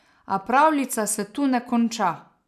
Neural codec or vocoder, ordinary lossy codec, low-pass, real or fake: none; none; 14.4 kHz; real